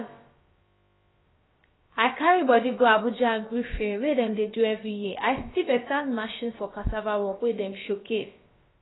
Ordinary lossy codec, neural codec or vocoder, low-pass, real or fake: AAC, 16 kbps; codec, 16 kHz, about 1 kbps, DyCAST, with the encoder's durations; 7.2 kHz; fake